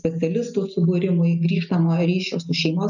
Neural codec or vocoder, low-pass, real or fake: none; 7.2 kHz; real